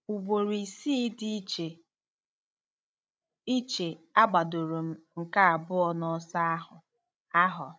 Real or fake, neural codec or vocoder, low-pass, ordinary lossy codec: fake; codec, 16 kHz, 16 kbps, FreqCodec, larger model; none; none